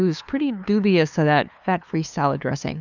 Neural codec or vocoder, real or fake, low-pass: codec, 16 kHz, 2 kbps, FunCodec, trained on LibriTTS, 25 frames a second; fake; 7.2 kHz